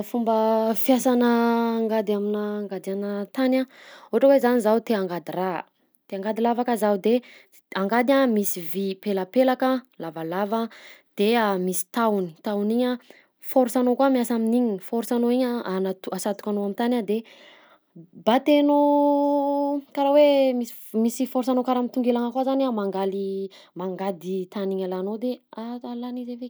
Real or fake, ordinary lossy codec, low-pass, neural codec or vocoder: real; none; none; none